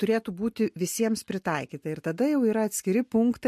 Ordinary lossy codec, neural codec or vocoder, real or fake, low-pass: MP3, 64 kbps; none; real; 14.4 kHz